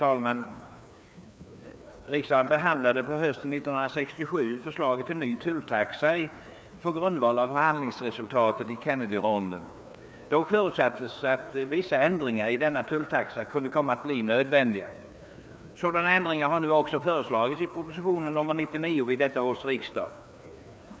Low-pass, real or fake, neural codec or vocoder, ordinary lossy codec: none; fake; codec, 16 kHz, 2 kbps, FreqCodec, larger model; none